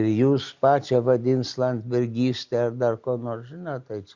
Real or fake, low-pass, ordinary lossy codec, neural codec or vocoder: real; 7.2 kHz; Opus, 64 kbps; none